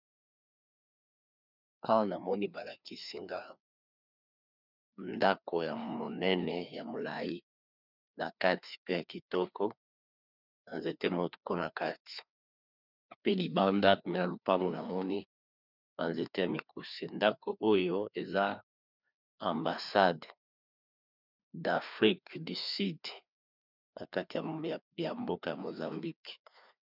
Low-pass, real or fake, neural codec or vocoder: 5.4 kHz; fake; codec, 16 kHz, 2 kbps, FreqCodec, larger model